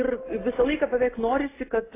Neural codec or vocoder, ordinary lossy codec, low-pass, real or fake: none; AAC, 16 kbps; 3.6 kHz; real